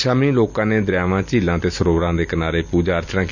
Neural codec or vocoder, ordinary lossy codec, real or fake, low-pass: none; none; real; 7.2 kHz